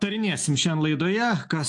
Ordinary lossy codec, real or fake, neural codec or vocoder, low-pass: AAC, 64 kbps; real; none; 10.8 kHz